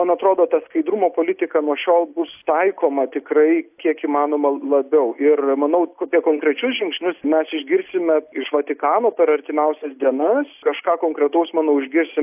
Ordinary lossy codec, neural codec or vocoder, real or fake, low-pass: AAC, 32 kbps; none; real; 3.6 kHz